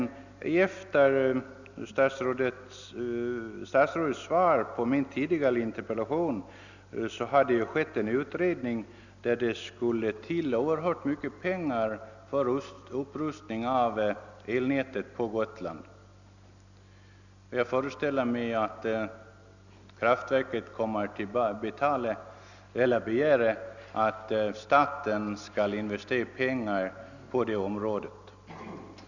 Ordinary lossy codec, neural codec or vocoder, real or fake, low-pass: none; none; real; 7.2 kHz